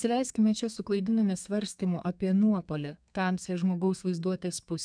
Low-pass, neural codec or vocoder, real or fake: 9.9 kHz; codec, 32 kHz, 1.9 kbps, SNAC; fake